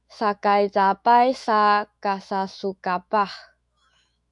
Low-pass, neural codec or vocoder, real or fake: 10.8 kHz; autoencoder, 48 kHz, 128 numbers a frame, DAC-VAE, trained on Japanese speech; fake